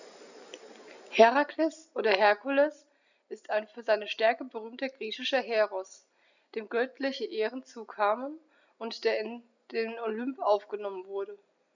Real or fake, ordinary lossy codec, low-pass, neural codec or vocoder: real; none; 7.2 kHz; none